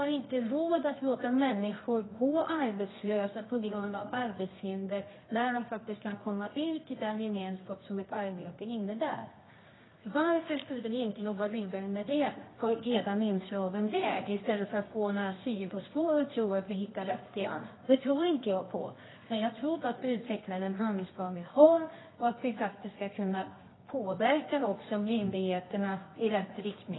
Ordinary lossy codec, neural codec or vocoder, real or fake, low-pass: AAC, 16 kbps; codec, 24 kHz, 0.9 kbps, WavTokenizer, medium music audio release; fake; 7.2 kHz